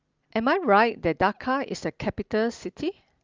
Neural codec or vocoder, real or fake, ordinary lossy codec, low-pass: none; real; Opus, 32 kbps; 7.2 kHz